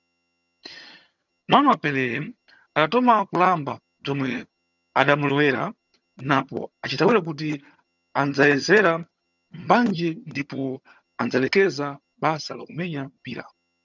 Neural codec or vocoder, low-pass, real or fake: vocoder, 22.05 kHz, 80 mel bands, HiFi-GAN; 7.2 kHz; fake